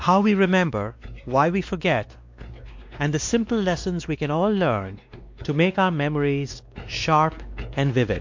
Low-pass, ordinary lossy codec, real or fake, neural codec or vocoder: 7.2 kHz; MP3, 48 kbps; fake; codec, 16 kHz, 2 kbps, X-Codec, WavLM features, trained on Multilingual LibriSpeech